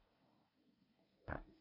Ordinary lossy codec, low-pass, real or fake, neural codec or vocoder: MP3, 48 kbps; 5.4 kHz; fake; codec, 24 kHz, 1 kbps, SNAC